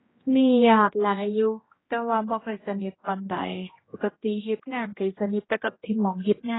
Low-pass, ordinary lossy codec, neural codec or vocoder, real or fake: 7.2 kHz; AAC, 16 kbps; codec, 16 kHz, 1 kbps, X-Codec, HuBERT features, trained on general audio; fake